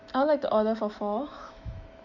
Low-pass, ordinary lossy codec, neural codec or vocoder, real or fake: 7.2 kHz; none; autoencoder, 48 kHz, 128 numbers a frame, DAC-VAE, trained on Japanese speech; fake